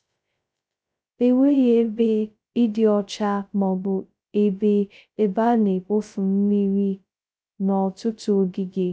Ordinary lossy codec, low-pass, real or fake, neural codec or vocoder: none; none; fake; codec, 16 kHz, 0.2 kbps, FocalCodec